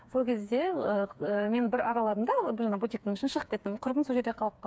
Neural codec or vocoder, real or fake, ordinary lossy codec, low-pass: codec, 16 kHz, 4 kbps, FreqCodec, smaller model; fake; none; none